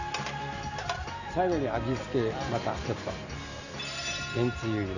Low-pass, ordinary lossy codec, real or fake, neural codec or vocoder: 7.2 kHz; none; real; none